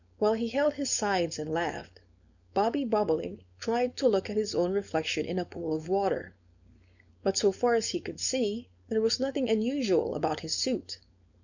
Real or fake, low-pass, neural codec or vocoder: fake; 7.2 kHz; codec, 16 kHz, 4.8 kbps, FACodec